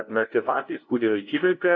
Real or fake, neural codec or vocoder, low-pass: fake; codec, 16 kHz, 0.5 kbps, FunCodec, trained on LibriTTS, 25 frames a second; 7.2 kHz